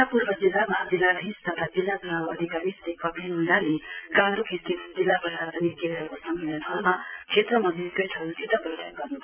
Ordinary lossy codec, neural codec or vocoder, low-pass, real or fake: none; vocoder, 22.05 kHz, 80 mel bands, Vocos; 3.6 kHz; fake